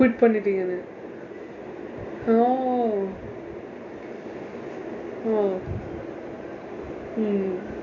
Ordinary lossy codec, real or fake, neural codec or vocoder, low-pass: none; real; none; 7.2 kHz